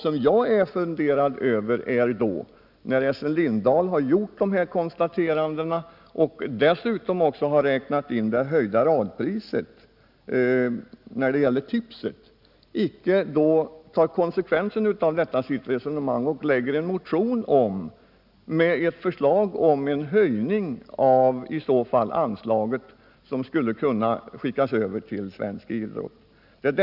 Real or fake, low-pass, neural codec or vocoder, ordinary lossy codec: real; 5.4 kHz; none; none